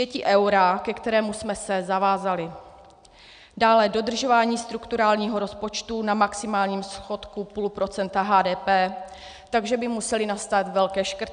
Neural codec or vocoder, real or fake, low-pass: none; real; 9.9 kHz